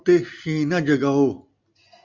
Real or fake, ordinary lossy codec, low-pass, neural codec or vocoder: real; MP3, 64 kbps; 7.2 kHz; none